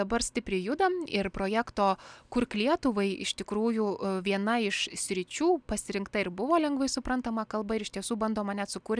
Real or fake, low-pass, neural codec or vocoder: real; 9.9 kHz; none